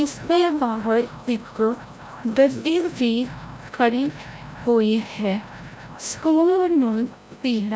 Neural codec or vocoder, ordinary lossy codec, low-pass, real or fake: codec, 16 kHz, 0.5 kbps, FreqCodec, larger model; none; none; fake